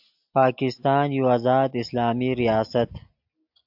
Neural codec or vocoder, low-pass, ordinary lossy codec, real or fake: none; 5.4 kHz; AAC, 48 kbps; real